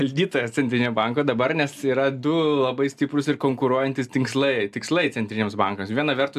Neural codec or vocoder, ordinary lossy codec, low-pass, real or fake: none; AAC, 96 kbps; 14.4 kHz; real